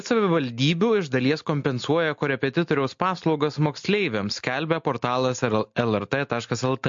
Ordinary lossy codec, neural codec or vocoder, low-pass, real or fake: MP3, 48 kbps; none; 7.2 kHz; real